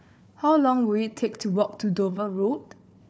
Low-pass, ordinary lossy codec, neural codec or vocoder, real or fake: none; none; codec, 16 kHz, 4 kbps, FunCodec, trained on Chinese and English, 50 frames a second; fake